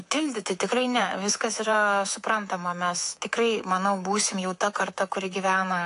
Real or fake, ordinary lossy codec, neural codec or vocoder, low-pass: fake; AAC, 48 kbps; codec, 24 kHz, 3.1 kbps, DualCodec; 10.8 kHz